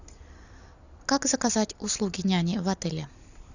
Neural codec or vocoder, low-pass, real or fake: none; 7.2 kHz; real